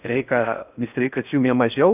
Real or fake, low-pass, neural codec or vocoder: fake; 3.6 kHz; codec, 16 kHz in and 24 kHz out, 0.6 kbps, FocalCodec, streaming, 4096 codes